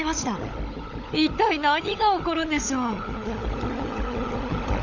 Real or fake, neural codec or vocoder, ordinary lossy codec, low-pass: fake; codec, 16 kHz, 16 kbps, FunCodec, trained on LibriTTS, 50 frames a second; none; 7.2 kHz